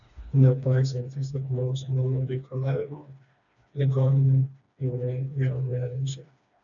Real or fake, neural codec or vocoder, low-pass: fake; codec, 16 kHz, 2 kbps, FreqCodec, smaller model; 7.2 kHz